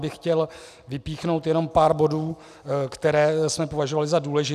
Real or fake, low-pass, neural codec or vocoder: real; 14.4 kHz; none